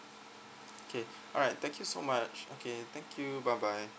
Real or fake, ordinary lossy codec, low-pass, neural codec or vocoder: real; none; none; none